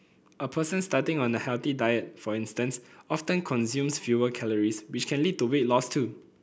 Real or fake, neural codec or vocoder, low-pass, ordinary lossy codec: real; none; none; none